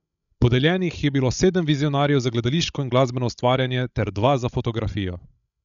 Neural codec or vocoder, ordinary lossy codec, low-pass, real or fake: codec, 16 kHz, 16 kbps, FreqCodec, larger model; none; 7.2 kHz; fake